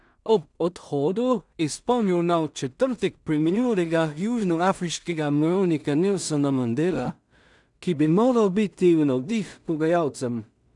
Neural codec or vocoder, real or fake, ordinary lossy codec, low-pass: codec, 16 kHz in and 24 kHz out, 0.4 kbps, LongCat-Audio-Codec, two codebook decoder; fake; none; 10.8 kHz